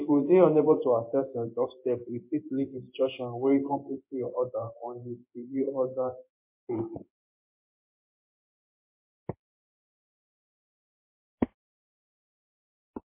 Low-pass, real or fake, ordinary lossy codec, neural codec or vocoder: 3.6 kHz; fake; MP3, 24 kbps; codec, 16 kHz in and 24 kHz out, 1 kbps, XY-Tokenizer